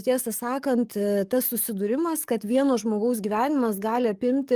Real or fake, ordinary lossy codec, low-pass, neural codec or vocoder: fake; Opus, 32 kbps; 14.4 kHz; codec, 44.1 kHz, 7.8 kbps, DAC